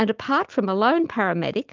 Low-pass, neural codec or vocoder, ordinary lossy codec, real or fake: 7.2 kHz; none; Opus, 32 kbps; real